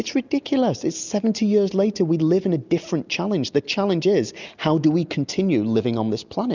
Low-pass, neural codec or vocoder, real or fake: 7.2 kHz; none; real